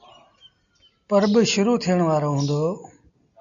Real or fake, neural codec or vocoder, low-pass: real; none; 7.2 kHz